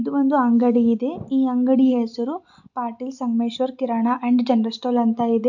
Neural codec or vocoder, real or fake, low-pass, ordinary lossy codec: none; real; 7.2 kHz; none